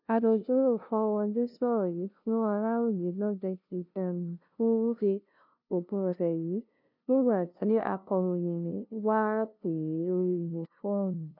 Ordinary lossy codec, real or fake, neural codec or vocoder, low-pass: none; fake; codec, 16 kHz, 0.5 kbps, FunCodec, trained on LibriTTS, 25 frames a second; 5.4 kHz